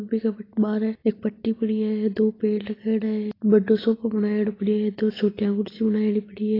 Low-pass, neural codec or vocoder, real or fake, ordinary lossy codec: 5.4 kHz; none; real; AAC, 24 kbps